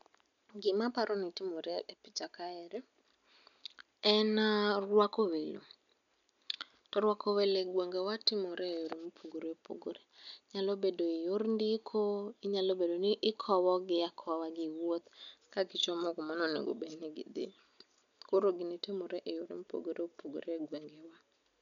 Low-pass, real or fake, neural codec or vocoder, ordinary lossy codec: 7.2 kHz; real; none; none